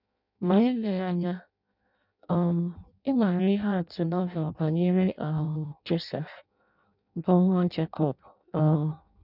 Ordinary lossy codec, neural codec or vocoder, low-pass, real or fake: none; codec, 16 kHz in and 24 kHz out, 0.6 kbps, FireRedTTS-2 codec; 5.4 kHz; fake